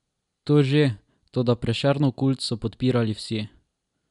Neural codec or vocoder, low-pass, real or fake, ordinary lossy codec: none; 10.8 kHz; real; none